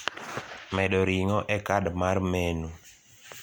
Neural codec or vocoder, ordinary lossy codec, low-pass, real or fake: none; none; none; real